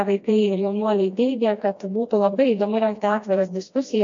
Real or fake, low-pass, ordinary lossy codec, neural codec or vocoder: fake; 7.2 kHz; MP3, 48 kbps; codec, 16 kHz, 1 kbps, FreqCodec, smaller model